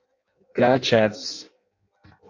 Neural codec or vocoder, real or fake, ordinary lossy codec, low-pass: codec, 16 kHz in and 24 kHz out, 0.6 kbps, FireRedTTS-2 codec; fake; MP3, 64 kbps; 7.2 kHz